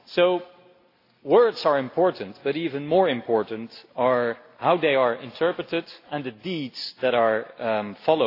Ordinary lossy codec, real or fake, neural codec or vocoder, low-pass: AAC, 32 kbps; real; none; 5.4 kHz